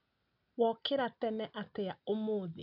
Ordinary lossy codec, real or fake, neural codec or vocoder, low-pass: none; real; none; 5.4 kHz